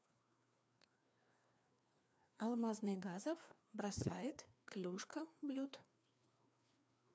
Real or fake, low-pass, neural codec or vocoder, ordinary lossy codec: fake; none; codec, 16 kHz, 2 kbps, FreqCodec, larger model; none